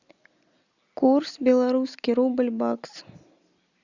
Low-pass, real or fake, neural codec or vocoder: 7.2 kHz; real; none